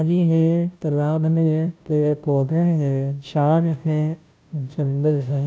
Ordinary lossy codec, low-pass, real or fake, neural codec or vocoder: none; none; fake; codec, 16 kHz, 0.5 kbps, FunCodec, trained on Chinese and English, 25 frames a second